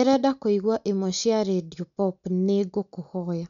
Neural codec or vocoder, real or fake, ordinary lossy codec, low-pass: none; real; none; 7.2 kHz